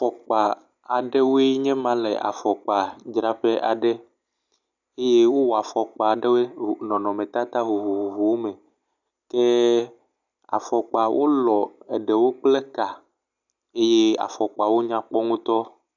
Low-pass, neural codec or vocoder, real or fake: 7.2 kHz; none; real